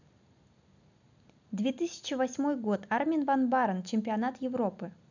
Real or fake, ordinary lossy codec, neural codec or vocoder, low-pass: real; none; none; 7.2 kHz